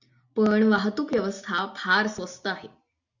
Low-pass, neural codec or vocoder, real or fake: 7.2 kHz; none; real